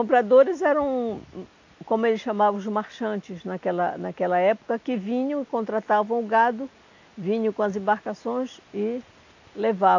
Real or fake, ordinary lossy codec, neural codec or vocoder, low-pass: real; AAC, 48 kbps; none; 7.2 kHz